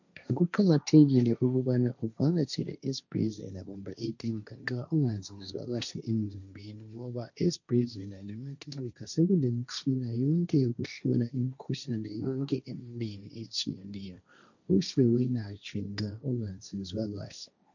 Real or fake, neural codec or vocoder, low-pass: fake; codec, 16 kHz, 1.1 kbps, Voila-Tokenizer; 7.2 kHz